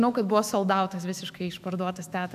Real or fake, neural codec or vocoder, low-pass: fake; autoencoder, 48 kHz, 128 numbers a frame, DAC-VAE, trained on Japanese speech; 14.4 kHz